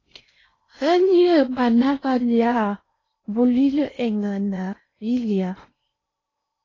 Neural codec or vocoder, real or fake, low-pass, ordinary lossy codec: codec, 16 kHz in and 24 kHz out, 0.6 kbps, FocalCodec, streaming, 4096 codes; fake; 7.2 kHz; AAC, 32 kbps